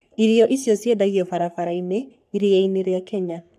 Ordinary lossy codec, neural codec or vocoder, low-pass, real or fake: none; codec, 44.1 kHz, 3.4 kbps, Pupu-Codec; 14.4 kHz; fake